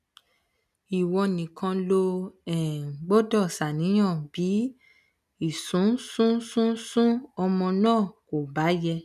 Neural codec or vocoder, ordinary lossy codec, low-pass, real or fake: none; none; 14.4 kHz; real